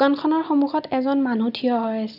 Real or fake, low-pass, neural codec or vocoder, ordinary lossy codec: real; 5.4 kHz; none; none